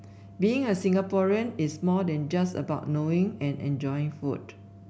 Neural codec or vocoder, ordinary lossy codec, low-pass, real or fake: none; none; none; real